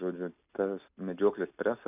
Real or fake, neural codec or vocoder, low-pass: real; none; 3.6 kHz